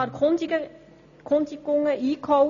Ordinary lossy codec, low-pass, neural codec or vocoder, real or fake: none; 7.2 kHz; none; real